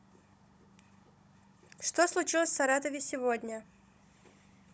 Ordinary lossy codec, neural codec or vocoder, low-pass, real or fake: none; codec, 16 kHz, 16 kbps, FunCodec, trained on Chinese and English, 50 frames a second; none; fake